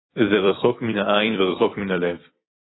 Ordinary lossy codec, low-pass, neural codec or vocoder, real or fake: AAC, 16 kbps; 7.2 kHz; vocoder, 44.1 kHz, 128 mel bands every 256 samples, BigVGAN v2; fake